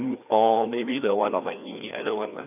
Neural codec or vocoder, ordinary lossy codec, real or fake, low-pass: codec, 16 kHz, 2 kbps, FreqCodec, larger model; none; fake; 3.6 kHz